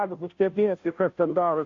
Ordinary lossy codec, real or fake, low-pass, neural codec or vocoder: MP3, 48 kbps; fake; 7.2 kHz; codec, 16 kHz, 0.5 kbps, FunCodec, trained on Chinese and English, 25 frames a second